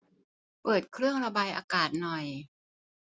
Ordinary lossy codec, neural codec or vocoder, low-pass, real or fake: none; none; none; real